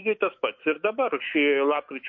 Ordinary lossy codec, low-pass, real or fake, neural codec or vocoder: MP3, 32 kbps; 7.2 kHz; fake; autoencoder, 48 kHz, 128 numbers a frame, DAC-VAE, trained on Japanese speech